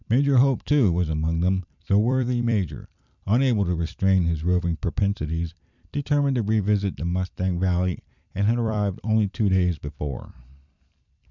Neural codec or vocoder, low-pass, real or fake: vocoder, 44.1 kHz, 128 mel bands every 256 samples, BigVGAN v2; 7.2 kHz; fake